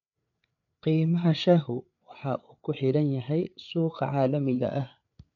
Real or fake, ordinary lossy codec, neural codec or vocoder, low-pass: fake; none; codec, 16 kHz, 8 kbps, FreqCodec, larger model; 7.2 kHz